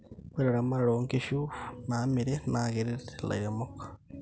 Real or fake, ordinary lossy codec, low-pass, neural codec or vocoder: real; none; none; none